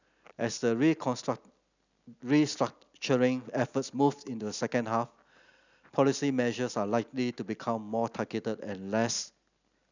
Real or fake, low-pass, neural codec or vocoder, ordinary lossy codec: real; 7.2 kHz; none; none